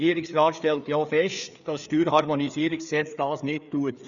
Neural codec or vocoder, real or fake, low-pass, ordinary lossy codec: codec, 16 kHz, 4 kbps, FreqCodec, larger model; fake; 7.2 kHz; none